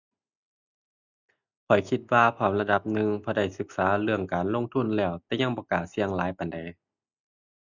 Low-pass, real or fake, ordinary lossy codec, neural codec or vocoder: 7.2 kHz; real; none; none